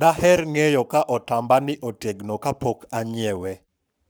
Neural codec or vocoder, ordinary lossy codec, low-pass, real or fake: codec, 44.1 kHz, 7.8 kbps, Pupu-Codec; none; none; fake